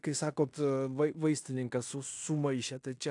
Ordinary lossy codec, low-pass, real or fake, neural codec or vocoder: AAC, 64 kbps; 10.8 kHz; fake; codec, 16 kHz in and 24 kHz out, 0.9 kbps, LongCat-Audio-Codec, fine tuned four codebook decoder